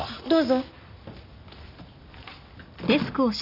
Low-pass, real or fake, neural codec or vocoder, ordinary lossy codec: 5.4 kHz; real; none; none